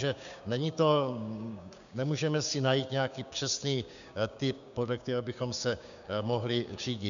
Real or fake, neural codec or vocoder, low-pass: fake; codec, 16 kHz, 6 kbps, DAC; 7.2 kHz